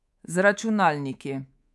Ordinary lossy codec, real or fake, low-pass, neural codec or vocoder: none; fake; none; codec, 24 kHz, 3.1 kbps, DualCodec